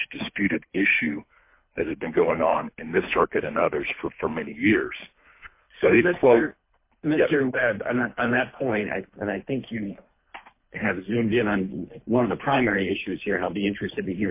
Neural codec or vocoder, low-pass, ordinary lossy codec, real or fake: codec, 24 kHz, 3 kbps, HILCodec; 3.6 kHz; MP3, 24 kbps; fake